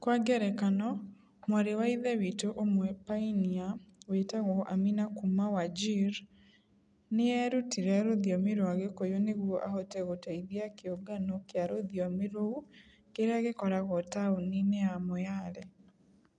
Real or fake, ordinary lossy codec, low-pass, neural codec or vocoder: real; none; 9.9 kHz; none